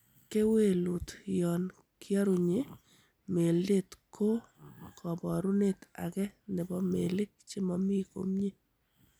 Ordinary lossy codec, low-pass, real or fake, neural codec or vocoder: none; none; real; none